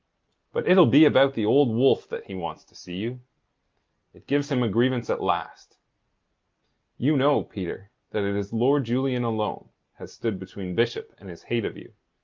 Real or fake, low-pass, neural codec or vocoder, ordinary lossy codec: real; 7.2 kHz; none; Opus, 32 kbps